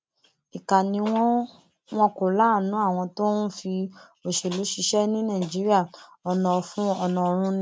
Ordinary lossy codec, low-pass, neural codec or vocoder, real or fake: none; none; none; real